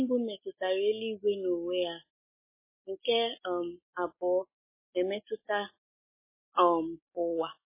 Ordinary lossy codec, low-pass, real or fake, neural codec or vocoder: MP3, 16 kbps; 3.6 kHz; real; none